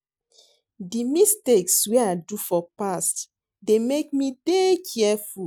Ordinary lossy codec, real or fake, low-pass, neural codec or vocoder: none; real; none; none